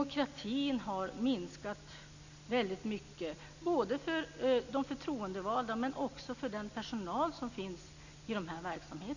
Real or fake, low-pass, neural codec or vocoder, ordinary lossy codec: real; 7.2 kHz; none; none